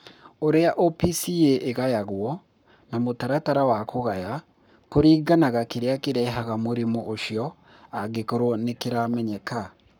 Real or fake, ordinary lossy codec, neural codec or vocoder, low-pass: fake; none; codec, 44.1 kHz, 7.8 kbps, Pupu-Codec; 19.8 kHz